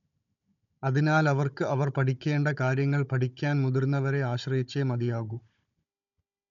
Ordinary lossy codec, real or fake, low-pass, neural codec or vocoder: none; fake; 7.2 kHz; codec, 16 kHz, 16 kbps, FunCodec, trained on Chinese and English, 50 frames a second